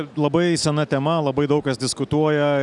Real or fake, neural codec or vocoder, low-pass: real; none; 10.8 kHz